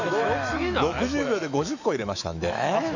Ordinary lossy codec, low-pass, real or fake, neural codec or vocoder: none; 7.2 kHz; fake; autoencoder, 48 kHz, 128 numbers a frame, DAC-VAE, trained on Japanese speech